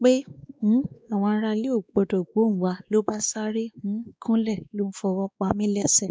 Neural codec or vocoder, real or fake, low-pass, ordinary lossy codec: codec, 16 kHz, 2 kbps, X-Codec, WavLM features, trained on Multilingual LibriSpeech; fake; none; none